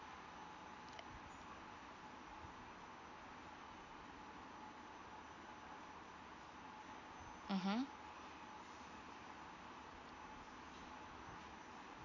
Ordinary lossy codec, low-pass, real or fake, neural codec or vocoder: none; 7.2 kHz; real; none